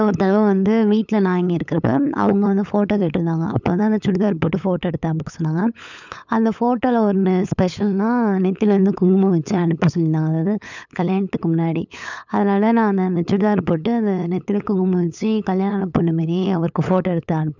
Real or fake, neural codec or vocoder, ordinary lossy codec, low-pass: fake; codec, 16 kHz, 8 kbps, FunCodec, trained on LibriTTS, 25 frames a second; none; 7.2 kHz